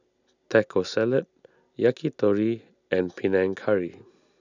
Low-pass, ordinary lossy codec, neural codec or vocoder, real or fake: 7.2 kHz; none; none; real